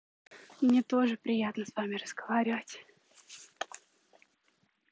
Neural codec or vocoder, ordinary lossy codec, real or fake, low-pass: none; none; real; none